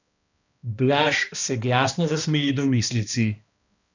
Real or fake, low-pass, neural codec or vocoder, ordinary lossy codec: fake; 7.2 kHz; codec, 16 kHz, 1 kbps, X-Codec, HuBERT features, trained on balanced general audio; none